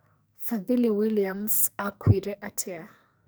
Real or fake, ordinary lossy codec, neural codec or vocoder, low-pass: fake; none; codec, 44.1 kHz, 2.6 kbps, SNAC; none